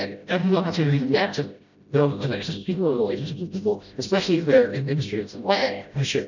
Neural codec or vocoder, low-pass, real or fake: codec, 16 kHz, 0.5 kbps, FreqCodec, smaller model; 7.2 kHz; fake